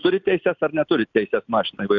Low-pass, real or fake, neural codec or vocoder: 7.2 kHz; real; none